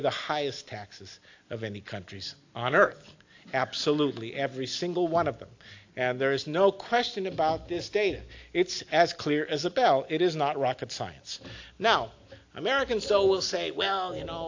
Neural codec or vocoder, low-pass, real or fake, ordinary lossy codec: none; 7.2 kHz; real; AAC, 48 kbps